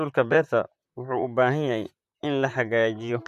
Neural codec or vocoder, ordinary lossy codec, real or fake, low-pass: vocoder, 44.1 kHz, 128 mel bands, Pupu-Vocoder; none; fake; 14.4 kHz